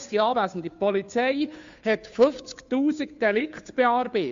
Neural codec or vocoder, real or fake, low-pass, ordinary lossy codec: codec, 16 kHz, 2 kbps, FunCodec, trained on Chinese and English, 25 frames a second; fake; 7.2 kHz; MP3, 64 kbps